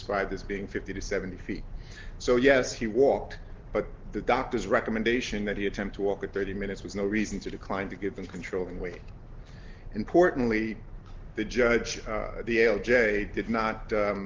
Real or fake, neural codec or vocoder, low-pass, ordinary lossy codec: real; none; 7.2 kHz; Opus, 16 kbps